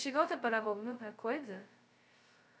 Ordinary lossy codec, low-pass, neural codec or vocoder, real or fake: none; none; codec, 16 kHz, 0.2 kbps, FocalCodec; fake